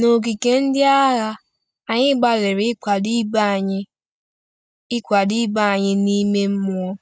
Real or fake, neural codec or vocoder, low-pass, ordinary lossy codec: real; none; none; none